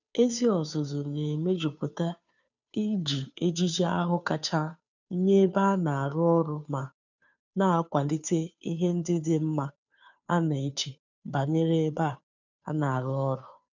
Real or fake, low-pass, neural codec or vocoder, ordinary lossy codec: fake; 7.2 kHz; codec, 16 kHz, 2 kbps, FunCodec, trained on Chinese and English, 25 frames a second; none